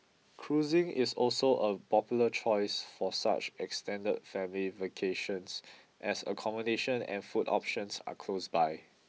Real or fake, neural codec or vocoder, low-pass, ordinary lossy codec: real; none; none; none